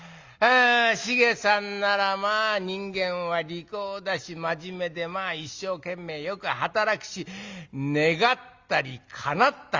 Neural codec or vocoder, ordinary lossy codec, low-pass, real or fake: none; Opus, 32 kbps; 7.2 kHz; real